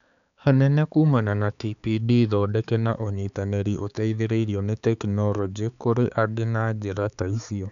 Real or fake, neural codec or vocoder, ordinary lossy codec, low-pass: fake; codec, 16 kHz, 4 kbps, X-Codec, HuBERT features, trained on balanced general audio; none; 7.2 kHz